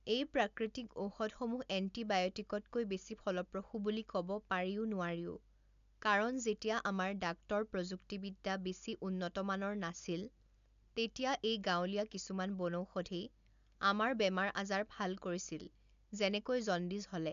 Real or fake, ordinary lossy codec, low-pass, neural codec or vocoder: real; AAC, 96 kbps; 7.2 kHz; none